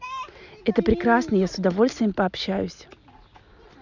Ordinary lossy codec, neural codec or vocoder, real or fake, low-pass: none; none; real; 7.2 kHz